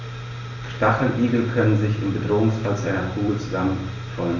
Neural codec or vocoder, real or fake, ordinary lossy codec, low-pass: none; real; none; 7.2 kHz